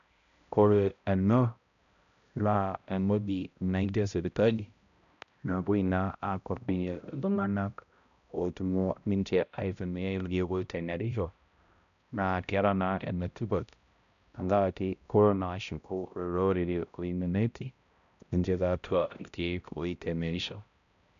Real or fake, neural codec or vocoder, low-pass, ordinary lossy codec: fake; codec, 16 kHz, 0.5 kbps, X-Codec, HuBERT features, trained on balanced general audio; 7.2 kHz; none